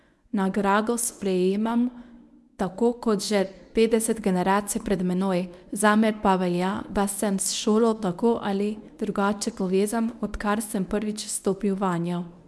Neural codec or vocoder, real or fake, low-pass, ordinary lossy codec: codec, 24 kHz, 0.9 kbps, WavTokenizer, medium speech release version 1; fake; none; none